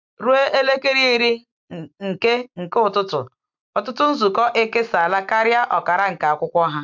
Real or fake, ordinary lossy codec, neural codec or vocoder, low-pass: real; MP3, 64 kbps; none; 7.2 kHz